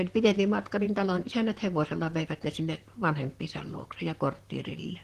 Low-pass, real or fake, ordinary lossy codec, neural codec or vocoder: 14.4 kHz; fake; Opus, 16 kbps; vocoder, 44.1 kHz, 128 mel bands, Pupu-Vocoder